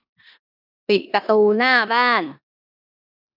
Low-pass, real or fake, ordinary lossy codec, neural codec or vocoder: 5.4 kHz; fake; none; codec, 16 kHz in and 24 kHz out, 0.9 kbps, LongCat-Audio-Codec, four codebook decoder